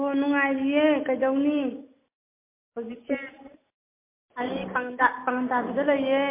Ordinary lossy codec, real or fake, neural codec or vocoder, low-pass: MP3, 24 kbps; real; none; 3.6 kHz